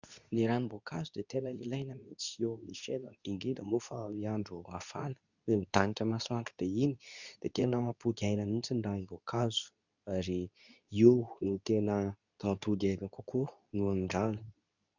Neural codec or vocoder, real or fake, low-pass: codec, 24 kHz, 0.9 kbps, WavTokenizer, medium speech release version 2; fake; 7.2 kHz